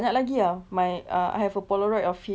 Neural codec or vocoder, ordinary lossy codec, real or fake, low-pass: none; none; real; none